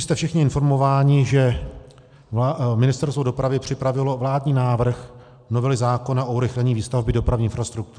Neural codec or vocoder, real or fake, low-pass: none; real; 9.9 kHz